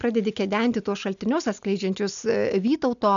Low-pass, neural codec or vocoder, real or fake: 7.2 kHz; none; real